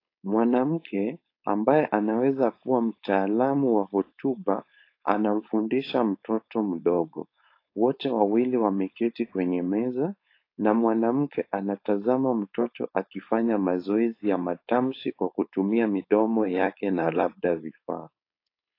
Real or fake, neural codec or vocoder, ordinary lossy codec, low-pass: fake; codec, 16 kHz, 4.8 kbps, FACodec; AAC, 32 kbps; 5.4 kHz